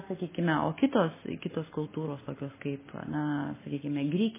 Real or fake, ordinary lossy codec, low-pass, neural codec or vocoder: real; MP3, 16 kbps; 3.6 kHz; none